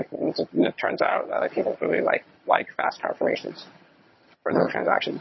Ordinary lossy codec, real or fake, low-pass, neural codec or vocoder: MP3, 24 kbps; fake; 7.2 kHz; vocoder, 22.05 kHz, 80 mel bands, HiFi-GAN